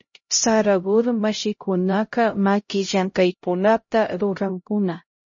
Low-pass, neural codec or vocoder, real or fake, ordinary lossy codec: 7.2 kHz; codec, 16 kHz, 0.5 kbps, X-Codec, HuBERT features, trained on LibriSpeech; fake; MP3, 32 kbps